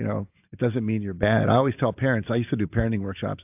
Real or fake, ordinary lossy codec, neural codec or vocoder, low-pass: real; AAC, 32 kbps; none; 3.6 kHz